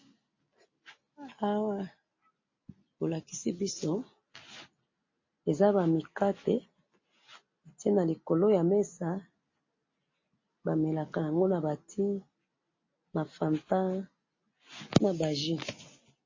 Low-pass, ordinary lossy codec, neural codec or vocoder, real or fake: 7.2 kHz; MP3, 32 kbps; none; real